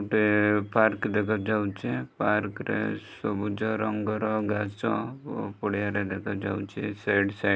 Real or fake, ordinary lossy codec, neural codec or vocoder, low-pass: real; none; none; none